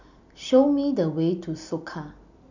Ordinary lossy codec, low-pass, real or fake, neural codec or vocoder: none; 7.2 kHz; real; none